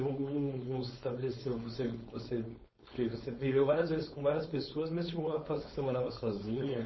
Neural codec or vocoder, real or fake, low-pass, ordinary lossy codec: codec, 16 kHz, 4.8 kbps, FACodec; fake; 7.2 kHz; MP3, 24 kbps